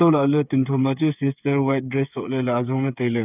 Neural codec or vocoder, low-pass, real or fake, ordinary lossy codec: codec, 16 kHz, 8 kbps, FreqCodec, smaller model; 3.6 kHz; fake; none